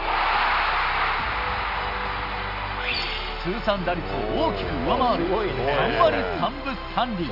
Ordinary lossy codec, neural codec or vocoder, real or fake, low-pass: none; none; real; 5.4 kHz